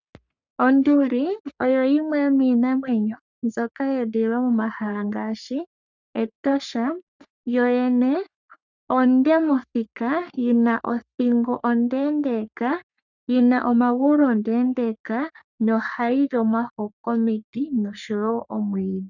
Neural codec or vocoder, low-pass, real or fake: codec, 44.1 kHz, 3.4 kbps, Pupu-Codec; 7.2 kHz; fake